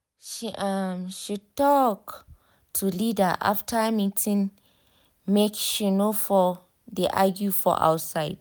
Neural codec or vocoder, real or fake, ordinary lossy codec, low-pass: none; real; none; none